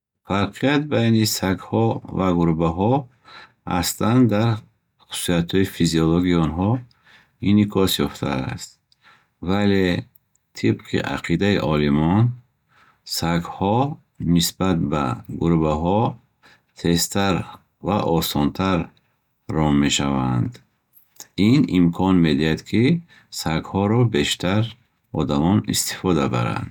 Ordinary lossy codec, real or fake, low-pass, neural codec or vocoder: none; real; 19.8 kHz; none